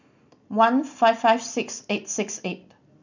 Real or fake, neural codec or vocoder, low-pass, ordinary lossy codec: real; none; 7.2 kHz; none